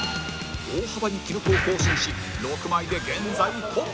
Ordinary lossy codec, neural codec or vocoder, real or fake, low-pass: none; none; real; none